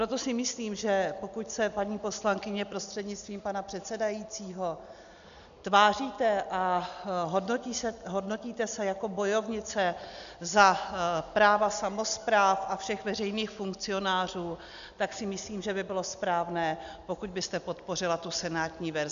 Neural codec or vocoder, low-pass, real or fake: none; 7.2 kHz; real